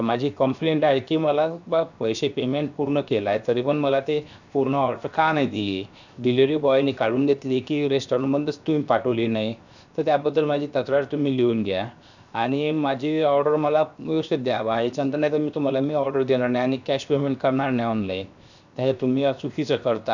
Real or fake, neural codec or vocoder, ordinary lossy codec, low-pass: fake; codec, 16 kHz, 0.7 kbps, FocalCodec; none; 7.2 kHz